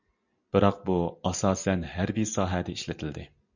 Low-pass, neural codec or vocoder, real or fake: 7.2 kHz; none; real